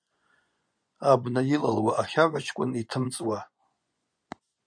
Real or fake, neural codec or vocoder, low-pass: fake; vocoder, 24 kHz, 100 mel bands, Vocos; 9.9 kHz